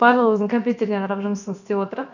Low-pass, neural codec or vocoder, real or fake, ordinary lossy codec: 7.2 kHz; codec, 16 kHz, about 1 kbps, DyCAST, with the encoder's durations; fake; none